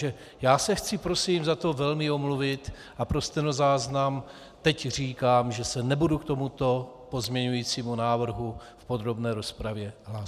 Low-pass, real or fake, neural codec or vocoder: 14.4 kHz; real; none